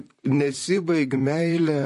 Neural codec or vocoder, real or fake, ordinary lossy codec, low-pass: vocoder, 44.1 kHz, 128 mel bands, Pupu-Vocoder; fake; MP3, 48 kbps; 14.4 kHz